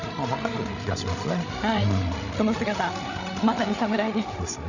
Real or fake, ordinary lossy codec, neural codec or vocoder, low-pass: fake; none; codec, 16 kHz, 16 kbps, FreqCodec, larger model; 7.2 kHz